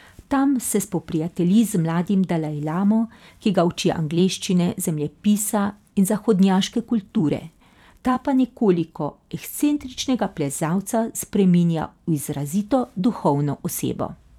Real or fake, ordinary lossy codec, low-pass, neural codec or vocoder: fake; none; 19.8 kHz; vocoder, 44.1 kHz, 128 mel bands every 256 samples, BigVGAN v2